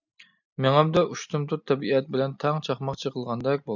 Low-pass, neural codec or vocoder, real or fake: 7.2 kHz; none; real